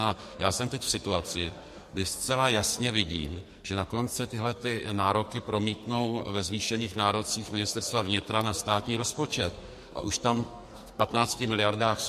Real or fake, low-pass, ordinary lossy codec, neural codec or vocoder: fake; 14.4 kHz; MP3, 64 kbps; codec, 44.1 kHz, 2.6 kbps, SNAC